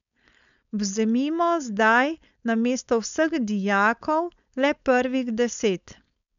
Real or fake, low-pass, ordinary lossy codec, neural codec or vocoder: fake; 7.2 kHz; none; codec, 16 kHz, 4.8 kbps, FACodec